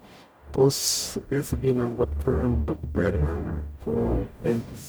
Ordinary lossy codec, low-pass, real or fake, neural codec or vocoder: none; none; fake; codec, 44.1 kHz, 0.9 kbps, DAC